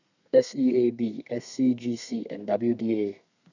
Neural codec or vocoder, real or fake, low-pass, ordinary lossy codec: codec, 32 kHz, 1.9 kbps, SNAC; fake; 7.2 kHz; none